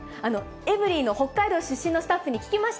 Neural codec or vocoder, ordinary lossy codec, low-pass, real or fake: none; none; none; real